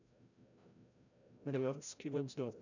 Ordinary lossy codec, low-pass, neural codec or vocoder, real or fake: none; 7.2 kHz; codec, 16 kHz, 0.5 kbps, FreqCodec, larger model; fake